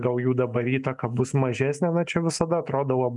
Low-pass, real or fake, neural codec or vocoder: 10.8 kHz; real; none